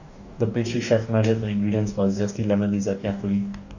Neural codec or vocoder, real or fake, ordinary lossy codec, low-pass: codec, 44.1 kHz, 2.6 kbps, DAC; fake; none; 7.2 kHz